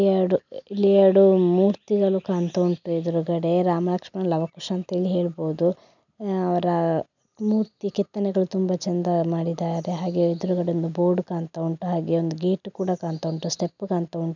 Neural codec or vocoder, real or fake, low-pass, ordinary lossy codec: none; real; 7.2 kHz; none